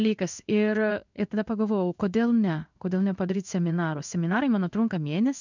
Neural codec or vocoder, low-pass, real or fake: codec, 16 kHz in and 24 kHz out, 1 kbps, XY-Tokenizer; 7.2 kHz; fake